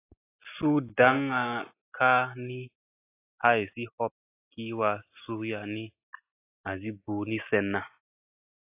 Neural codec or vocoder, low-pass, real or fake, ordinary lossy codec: none; 3.6 kHz; real; AAC, 24 kbps